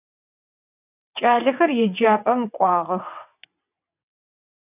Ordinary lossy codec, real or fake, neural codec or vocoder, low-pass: AAC, 32 kbps; fake; vocoder, 22.05 kHz, 80 mel bands, WaveNeXt; 3.6 kHz